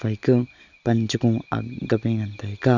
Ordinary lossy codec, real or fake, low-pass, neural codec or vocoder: none; real; 7.2 kHz; none